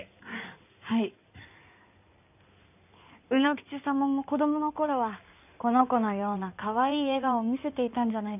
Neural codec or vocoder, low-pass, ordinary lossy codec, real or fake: codec, 16 kHz in and 24 kHz out, 2.2 kbps, FireRedTTS-2 codec; 3.6 kHz; none; fake